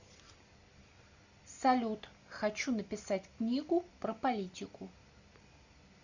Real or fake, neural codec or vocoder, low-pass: real; none; 7.2 kHz